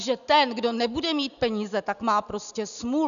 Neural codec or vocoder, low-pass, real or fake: none; 7.2 kHz; real